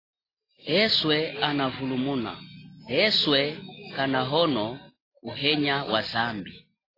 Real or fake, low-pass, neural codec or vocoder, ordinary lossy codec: real; 5.4 kHz; none; AAC, 24 kbps